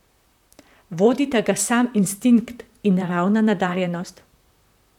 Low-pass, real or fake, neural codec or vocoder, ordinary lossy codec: 19.8 kHz; fake; vocoder, 44.1 kHz, 128 mel bands, Pupu-Vocoder; none